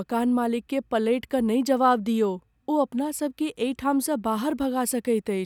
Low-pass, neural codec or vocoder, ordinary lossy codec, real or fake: 19.8 kHz; none; none; real